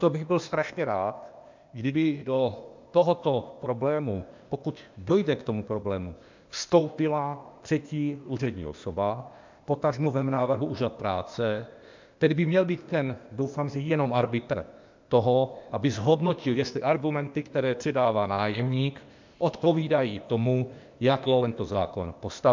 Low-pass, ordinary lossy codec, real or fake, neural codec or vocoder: 7.2 kHz; MP3, 64 kbps; fake; codec, 16 kHz, 0.8 kbps, ZipCodec